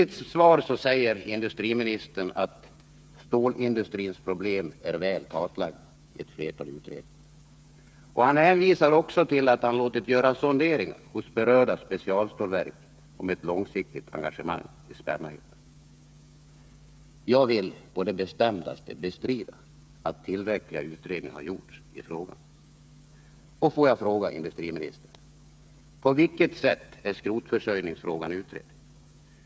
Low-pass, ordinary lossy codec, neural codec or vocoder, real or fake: none; none; codec, 16 kHz, 8 kbps, FreqCodec, smaller model; fake